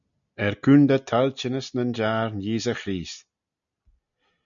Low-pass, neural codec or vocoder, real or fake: 7.2 kHz; none; real